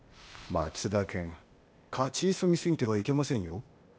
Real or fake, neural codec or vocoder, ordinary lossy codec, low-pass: fake; codec, 16 kHz, 0.8 kbps, ZipCodec; none; none